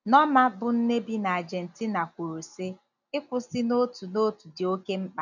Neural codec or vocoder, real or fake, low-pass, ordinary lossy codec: none; real; 7.2 kHz; none